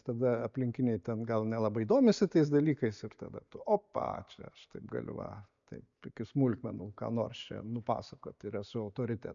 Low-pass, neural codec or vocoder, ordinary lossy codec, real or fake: 7.2 kHz; none; MP3, 96 kbps; real